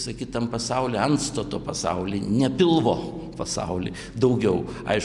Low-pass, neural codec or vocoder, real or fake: 10.8 kHz; none; real